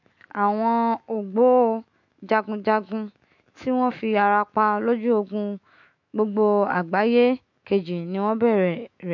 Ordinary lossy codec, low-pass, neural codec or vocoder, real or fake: MP3, 48 kbps; 7.2 kHz; autoencoder, 48 kHz, 128 numbers a frame, DAC-VAE, trained on Japanese speech; fake